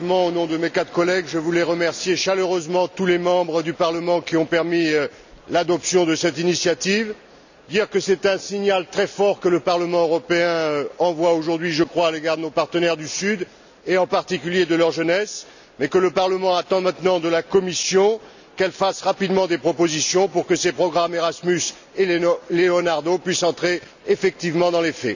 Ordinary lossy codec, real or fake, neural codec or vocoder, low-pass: none; real; none; 7.2 kHz